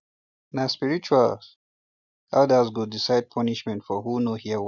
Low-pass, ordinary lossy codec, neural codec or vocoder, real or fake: 7.2 kHz; none; none; real